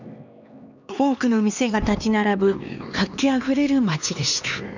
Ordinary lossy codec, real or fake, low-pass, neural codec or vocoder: none; fake; 7.2 kHz; codec, 16 kHz, 2 kbps, X-Codec, HuBERT features, trained on LibriSpeech